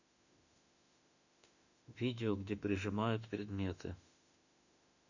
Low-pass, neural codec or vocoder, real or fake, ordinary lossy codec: 7.2 kHz; autoencoder, 48 kHz, 32 numbers a frame, DAC-VAE, trained on Japanese speech; fake; none